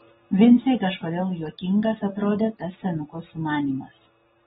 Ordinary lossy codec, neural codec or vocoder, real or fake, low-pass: AAC, 16 kbps; none; real; 19.8 kHz